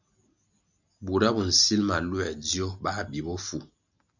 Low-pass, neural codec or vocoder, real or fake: 7.2 kHz; none; real